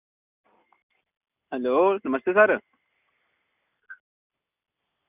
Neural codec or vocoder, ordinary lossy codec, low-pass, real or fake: none; none; 3.6 kHz; real